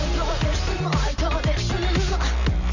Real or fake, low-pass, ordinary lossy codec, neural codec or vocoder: real; 7.2 kHz; none; none